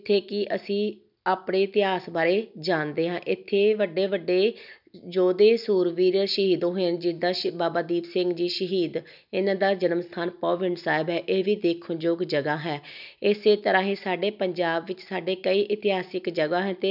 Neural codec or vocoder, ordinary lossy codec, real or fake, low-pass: none; none; real; 5.4 kHz